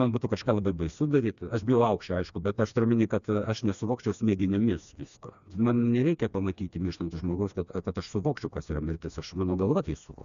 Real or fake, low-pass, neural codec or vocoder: fake; 7.2 kHz; codec, 16 kHz, 2 kbps, FreqCodec, smaller model